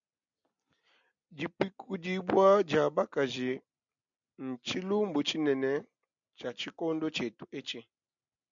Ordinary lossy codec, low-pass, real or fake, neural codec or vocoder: MP3, 96 kbps; 7.2 kHz; real; none